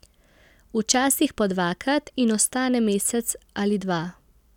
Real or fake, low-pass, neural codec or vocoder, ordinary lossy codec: real; 19.8 kHz; none; none